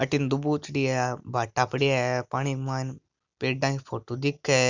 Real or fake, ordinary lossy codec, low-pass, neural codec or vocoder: real; none; 7.2 kHz; none